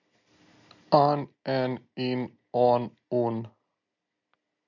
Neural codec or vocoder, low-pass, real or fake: none; 7.2 kHz; real